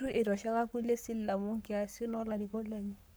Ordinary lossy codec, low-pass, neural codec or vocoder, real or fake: none; none; codec, 44.1 kHz, 7.8 kbps, Pupu-Codec; fake